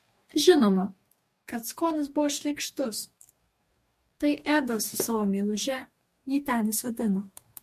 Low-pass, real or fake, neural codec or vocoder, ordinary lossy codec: 14.4 kHz; fake; codec, 44.1 kHz, 2.6 kbps, DAC; MP3, 64 kbps